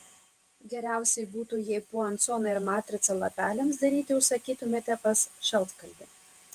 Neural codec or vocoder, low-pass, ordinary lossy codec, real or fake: vocoder, 48 kHz, 128 mel bands, Vocos; 14.4 kHz; Opus, 32 kbps; fake